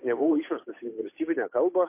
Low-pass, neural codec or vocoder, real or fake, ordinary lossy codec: 3.6 kHz; codec, 16 kHz, 8 kbps, FunCodec, trained on Chinese and English, 25 frames a second; fake; MP3, 24 kbps